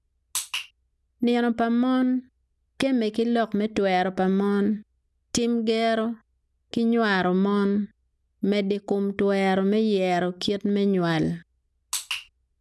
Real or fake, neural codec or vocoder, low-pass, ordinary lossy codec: real; none; none; none